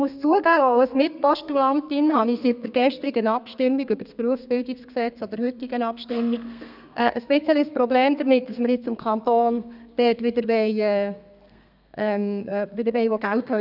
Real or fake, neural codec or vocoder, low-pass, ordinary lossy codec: fake; codec, 32 kHz, 1.9 kbps, SNAC; 5.4 kHz; none